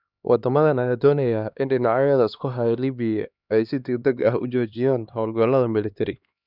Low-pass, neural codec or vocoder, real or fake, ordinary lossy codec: 5.4 kHz; codec, 16 kHz, 2 kbps, X-Codec, HuBERT features, trained on LibriSpeech; fake; none